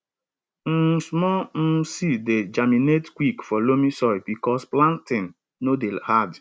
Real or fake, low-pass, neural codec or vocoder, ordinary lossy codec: real; none; none; none